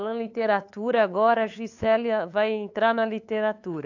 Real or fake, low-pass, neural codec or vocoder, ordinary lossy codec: fake; 7.2 kHz; codec, 16 kHz, 8 kbps, FunCodec, trained on LibriTTS, 25 frames a second; AAC, 48 kbps